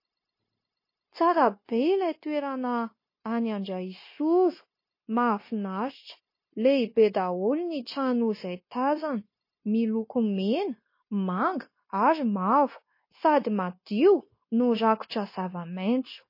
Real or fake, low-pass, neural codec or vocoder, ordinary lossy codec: fake; 5.4 kHz; codec, 16 kHz, 0.9 kbps, LongCat-Audio-Codec; MP3, 24 kbps